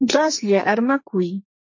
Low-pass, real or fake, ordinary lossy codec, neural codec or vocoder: 7.2 kHz; fake; MP3, 32 kbps; codec, 44.1 kHz, 2.6 kbps, SNAC